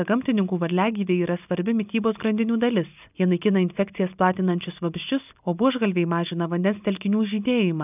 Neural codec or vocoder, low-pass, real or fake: none; 3.6 kHz; real